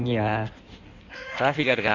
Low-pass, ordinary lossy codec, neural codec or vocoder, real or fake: 7.2 kHz; Opus, 64 kbps; codec, 16 kHz in and 24 kHz out, 1.1 kbps, FireRedTTS-2 codec; fake